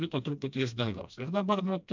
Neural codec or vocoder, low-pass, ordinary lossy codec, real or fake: codec, 16 kHz, 1 kbps, FreqCodec, smaller model; 7.2 kHz; MP3, 64 kbps; fake